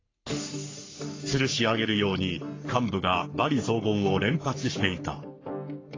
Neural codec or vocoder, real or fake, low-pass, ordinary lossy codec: codec, 44.1 kHz, 3.4 kbps, Pupu-Codec; fake; 7.2 kHz; AAC, 32 kbps